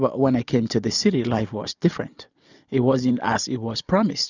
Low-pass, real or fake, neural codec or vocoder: 7.2 kHz; real; none